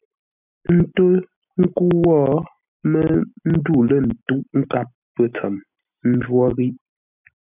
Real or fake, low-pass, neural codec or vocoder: real; 3.6 kHz; none